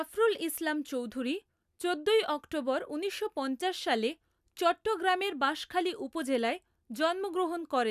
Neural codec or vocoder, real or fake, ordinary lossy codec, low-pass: none; real; none; 14.4 kHz